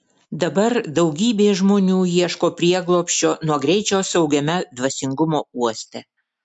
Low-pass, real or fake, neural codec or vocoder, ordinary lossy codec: 10.8 kHz; real; none; MP3, 64 kbps